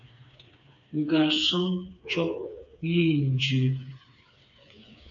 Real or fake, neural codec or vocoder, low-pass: fake; codec, 16 kHz, 4 kbps, FreqCodec, smaller model; 7.2 kHz